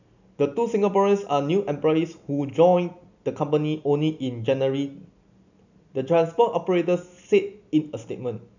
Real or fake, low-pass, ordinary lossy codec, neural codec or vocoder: real; 7.2 kHz; none; none